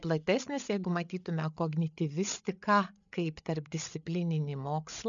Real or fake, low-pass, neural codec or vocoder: fake; 7.2 kHz; codec, 16 kHz, 8 kbps, FreqCodec, larger model